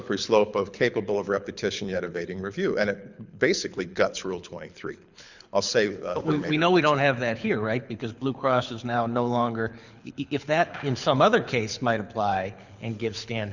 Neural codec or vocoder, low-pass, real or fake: codec, 24 kHz, 6 kbps, HILCodec; 7.2 kHz; fake